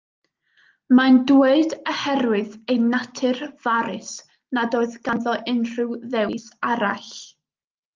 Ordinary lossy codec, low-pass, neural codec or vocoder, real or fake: Opus, 24 kbps; 7.2 kHz; none; real